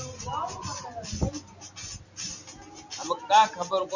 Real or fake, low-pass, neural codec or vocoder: real; 7.2 kHz; none